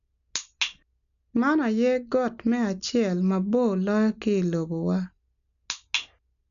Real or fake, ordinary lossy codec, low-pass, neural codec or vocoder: real; none; 7.2 kHz; none